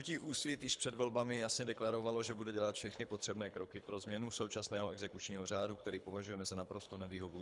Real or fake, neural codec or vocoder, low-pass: fake; codec, 24 kHz, 3 kbps, HILCodec; 10.8 kHz